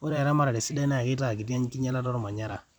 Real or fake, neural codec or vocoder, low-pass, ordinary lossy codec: fake; vocoder, 44.1 kHz, 128 mel bands every 256 samples, BigVGAN v2; 19.8 kHz; none